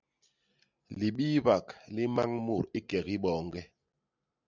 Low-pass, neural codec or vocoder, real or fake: 7.2 kHz; none; real